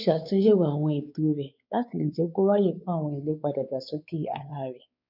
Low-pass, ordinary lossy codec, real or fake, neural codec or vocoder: 5.4 kHz; none; fake; codec, 16 kHz, 4 kbps, X-Codec, WavLM features, trained on Multilingual LibriSpeech